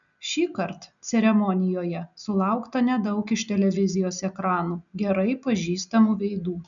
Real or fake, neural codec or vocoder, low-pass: real; none; 7.2 kHz